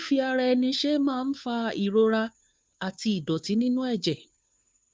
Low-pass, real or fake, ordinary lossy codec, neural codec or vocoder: none; fake; none; codec, 16 kHz, 2 kbps, FunCodec, trained on Chinese and English, 25 frames a second